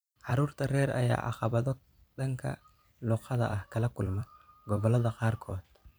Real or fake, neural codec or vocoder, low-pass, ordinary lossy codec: fake; vocoder, 44.1 kHz, 128 mel bands every 256 samples, BigVGAN v2; none; none